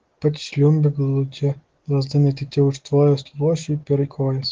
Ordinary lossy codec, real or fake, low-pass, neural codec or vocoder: Opus, 16 kbps; real; 7.2 kHz; none